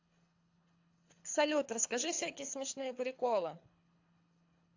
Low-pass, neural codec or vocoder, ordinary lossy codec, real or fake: 7.2 kHz; codec, 24 kHz, 3 kbps, HILCodec; AAC, 48 kbps; fake